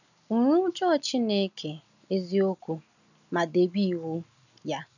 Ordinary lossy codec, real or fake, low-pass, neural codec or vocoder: none; real; 7.2 kHz; none